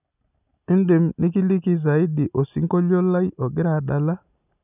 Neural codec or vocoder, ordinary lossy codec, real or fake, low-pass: none; none; real; 3.6 kHz